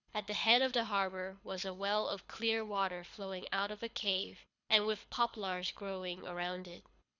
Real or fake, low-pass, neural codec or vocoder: fake; 7.2 kHz; codec, 24 kHz, 6 kbps, HILCodec